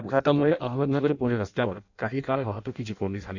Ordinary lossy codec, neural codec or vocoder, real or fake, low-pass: none; codec, 16 kHz in and 24 kHz out, 0.6 kbps, FireRedTTS-2 codec; fake; 7.2 kHz